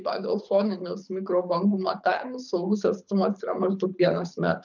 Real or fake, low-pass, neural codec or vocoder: fake; 7.2 kHz; codec, 24 kHz, 6 kbps, HILCodec